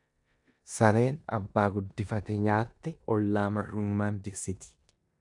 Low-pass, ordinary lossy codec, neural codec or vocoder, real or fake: 10.8 kHz; AAC, 64 kbps; codec, 16 kHz in and 24 kHz out, 0.9 kbps, LongCat-Audio-Codec, four codebook decoder; fake